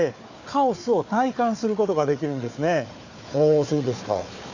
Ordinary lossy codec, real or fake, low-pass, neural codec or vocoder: none; fake; 7.2 kHz; codec, 16 kHz, 4 kbps, FunCodec, trained on Chinese and English, 50 frames a second